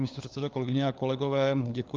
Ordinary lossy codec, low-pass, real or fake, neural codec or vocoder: Opus, 32 kbps; 7.2 kHz; fake; codec, 16 kHz, 4 kbps, FunCodec, trained on LibriTTS, 50 frames a second